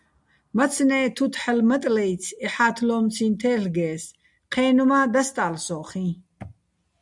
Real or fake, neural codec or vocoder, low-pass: real; none; 10.8 kHz